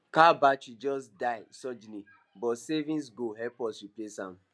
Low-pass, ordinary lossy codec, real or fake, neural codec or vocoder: none; none; real; none